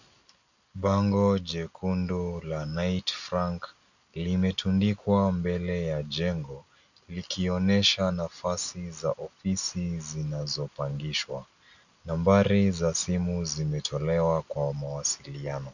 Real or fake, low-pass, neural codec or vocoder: real; 7.2 kHz; none